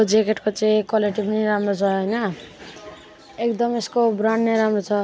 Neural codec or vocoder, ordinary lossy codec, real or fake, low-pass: none; none; real; none